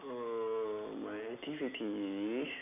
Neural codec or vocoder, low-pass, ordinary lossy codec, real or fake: none; 3.6 kHz; none; real